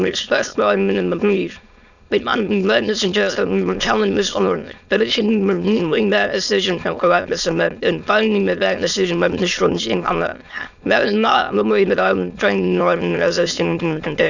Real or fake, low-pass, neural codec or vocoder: fake; 7.2 kHz; autoencoder, 22.05 kHz, a latent of 192 numbers a frame, VITS, trained on many speakers